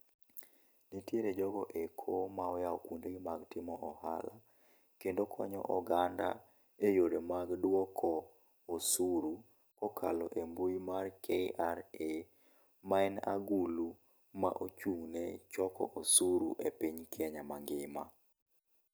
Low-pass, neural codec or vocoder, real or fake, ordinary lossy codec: none; none; real; none